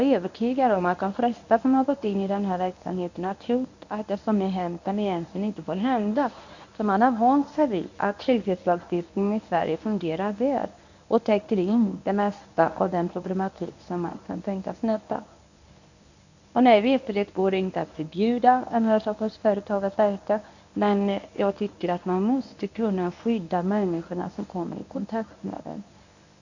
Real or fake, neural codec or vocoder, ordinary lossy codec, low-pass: fake; codec, 24 kHz, 0.9 kbps, WavTokenizer, medium speech release version 1; none; 7.2 kHz